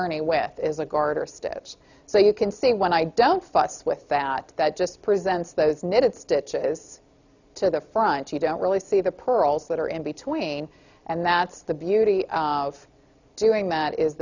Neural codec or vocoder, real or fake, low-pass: none; real; 7.2 kHz